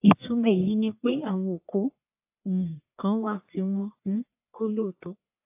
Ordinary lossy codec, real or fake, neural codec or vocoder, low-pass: AAC, 32 kbps; fake; codec, 44.1 kHz, 1.7 kbps, Pupu-Codec; 3.6 kHz